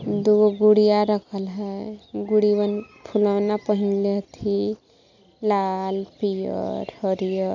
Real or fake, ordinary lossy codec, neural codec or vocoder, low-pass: real; none; none; 7.2 kHz